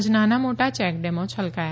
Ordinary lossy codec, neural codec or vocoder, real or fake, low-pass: none; none; real; none